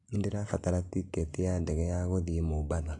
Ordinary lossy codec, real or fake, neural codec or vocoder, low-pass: AAC, 48 kbps; real; none; 10.8 kHz